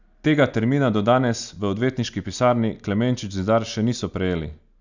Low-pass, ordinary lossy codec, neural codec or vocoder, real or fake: 7.2 kHz; none; none; real